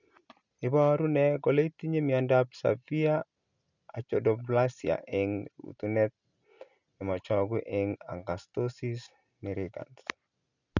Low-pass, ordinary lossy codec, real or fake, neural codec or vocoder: 7.2 kHz; none; real; none